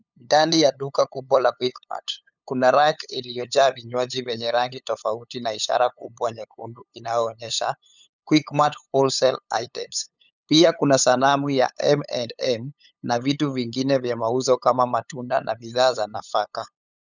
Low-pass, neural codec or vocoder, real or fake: 7.2 kHz; codec, 16 kHz, 8 kbps, FunCodec, trained on LibriTTS, 25 frames a second; fake